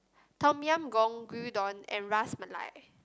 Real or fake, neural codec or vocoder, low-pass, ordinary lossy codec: real; none; none; none